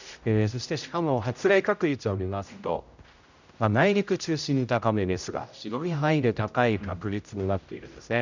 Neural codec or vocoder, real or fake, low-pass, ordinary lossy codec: codec, 16 kHz, 0.5 kbps, X-Codec, HuBERT features, trained on general audio; fake; 7.2 kHz; none